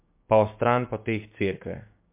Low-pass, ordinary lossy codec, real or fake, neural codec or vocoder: 3.6 kHz; MP3, 32 kbps; fake; codec, 16 kHz, 6 kbps, DAC